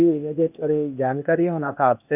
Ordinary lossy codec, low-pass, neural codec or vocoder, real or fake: none; 3.6 kHz; codec, 16 kHz, 0.8 kbps, ZipCodec; fake